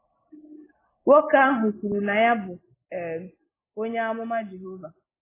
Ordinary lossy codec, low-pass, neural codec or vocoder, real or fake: AAC, 16 kbps; 3.6 kHz; none; real